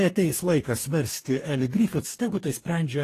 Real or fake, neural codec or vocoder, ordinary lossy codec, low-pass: fake; codec, 44.1 kHz, 2.6 kbps, DAC; AAC, 48 kbps; 14.4 kHz